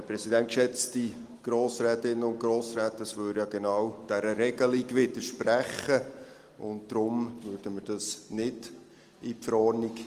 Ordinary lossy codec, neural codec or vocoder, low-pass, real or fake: Opus, 24 kbps; none; 14.4 kHz; real